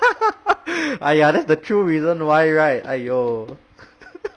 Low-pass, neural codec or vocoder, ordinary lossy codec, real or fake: 9.9 kHz; none; Opus, 64 kbps; real